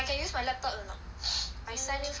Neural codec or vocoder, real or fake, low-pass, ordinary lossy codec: none; real; none; none